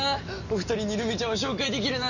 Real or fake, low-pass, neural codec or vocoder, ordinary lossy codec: real; 7.2 kHz; none; none